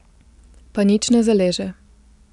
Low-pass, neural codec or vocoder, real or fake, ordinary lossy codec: 10.8 kHz; none; real; none